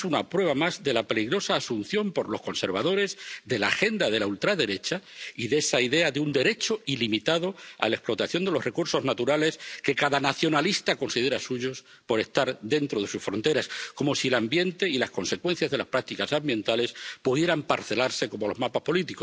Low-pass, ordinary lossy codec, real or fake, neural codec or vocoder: none; none; real; none